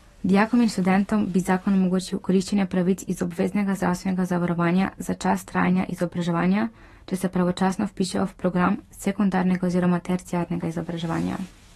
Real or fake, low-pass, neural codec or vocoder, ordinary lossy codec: fake; 19.8 kHz; autoencoder, 48 kHz, 128 numbers a frame, DAC-VAE, trained on Japanese speech; AAC, 32 kbps